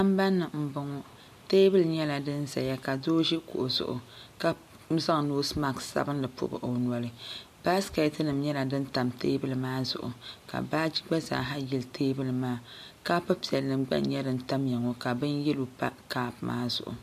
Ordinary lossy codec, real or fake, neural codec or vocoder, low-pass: MP3, 64 kbps; real; none; 14.4 kHz